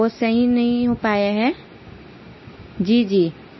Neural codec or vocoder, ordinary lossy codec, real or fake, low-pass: none; MP3, 24 kbps; real; 7.2 kHz